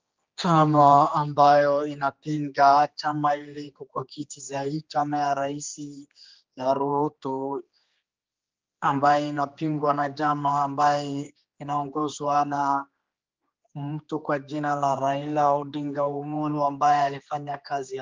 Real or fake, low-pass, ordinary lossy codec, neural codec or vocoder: fake; 7.2 kHz; Opus, 32 kbps; codec, 16 kHz, 2 kbps, X-Codec, HuBERT features, trained on general audio